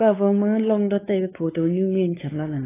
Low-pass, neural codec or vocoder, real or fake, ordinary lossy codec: 3.6 kHz; codec, 16 kHz, 4 kbps, X-Codec, WavLM features, trained on Multilingual LibriSpeech; fake; AAC, 16 kbps